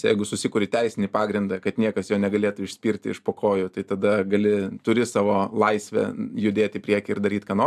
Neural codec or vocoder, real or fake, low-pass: none; real; 14.4 kHz